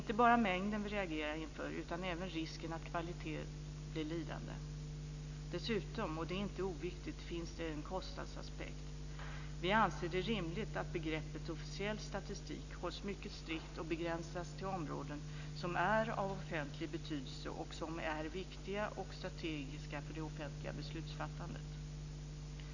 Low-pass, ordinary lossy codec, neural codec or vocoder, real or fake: 7.2 kHz; none; none; real